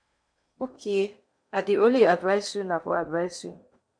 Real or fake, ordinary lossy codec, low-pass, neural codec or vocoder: fake; MP3, 64 kbps; 9.9 kHz; codec, 16 kHz in and 24 kHz out, 0.8 kbps, FocalCodec, streaming, 65536 codes